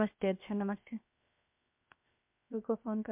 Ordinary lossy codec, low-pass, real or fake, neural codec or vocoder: MP3, 32 kbps; 3.6 kHz; fake; codec, 16 kHz, 0.7 kbps, FocalCodec